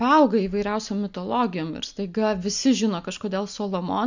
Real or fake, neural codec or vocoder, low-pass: real; none; 7.2 kHz